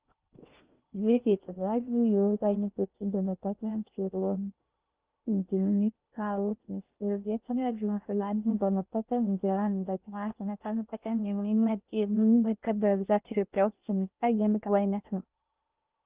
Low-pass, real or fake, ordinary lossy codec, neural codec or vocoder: 3.6 kHz; fake; Opus, 32 kbps; codec, 16 kHz in and 24 kHz out, 0.6 kbps, FocalCodec, streaming, 4096 codes